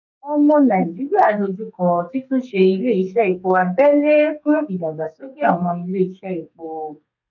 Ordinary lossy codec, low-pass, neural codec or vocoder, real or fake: none; 7.2 kHz; codec, 32 kHz, 1.9 kbps, SNAC; fake